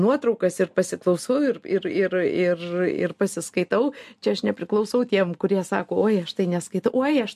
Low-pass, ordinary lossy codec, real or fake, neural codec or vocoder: 14.4 kHz; MP3, 64 kbps; real; none